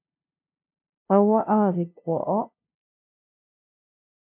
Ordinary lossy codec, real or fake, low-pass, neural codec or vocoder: MP3, 32 kbps; fake; 3.6 kHz; codec, 16 kHz, 0.5 kbps, FunCodec, trained on LibriTTS, 25 frames a second